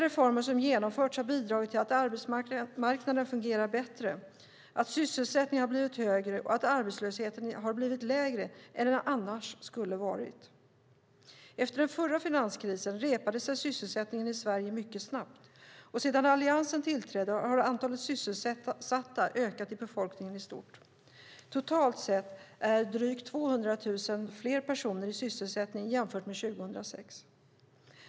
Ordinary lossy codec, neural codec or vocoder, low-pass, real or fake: none; none; none; real